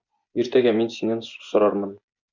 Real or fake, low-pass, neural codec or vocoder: fake; 7.2 kHz; vocoder, 24 kHz, 100 mel bands, Vocos